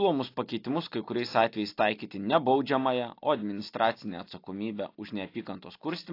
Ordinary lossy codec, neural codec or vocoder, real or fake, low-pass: AAC, 32 kbps; none; real; 5.4 kHz